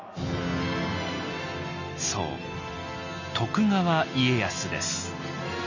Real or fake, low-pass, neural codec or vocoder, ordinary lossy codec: real; 7.2 kHz; none; none